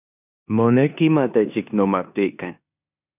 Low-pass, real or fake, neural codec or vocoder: 3.6 kHz; fake; codec, 16 kHz in and 24 kHz out, 0.9 kbps, LongCat-Audio-Codec, four codebook decoder